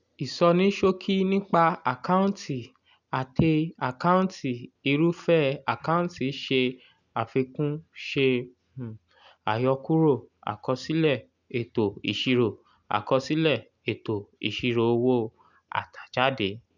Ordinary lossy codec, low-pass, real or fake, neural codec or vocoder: none; 7.2 kHz; real; none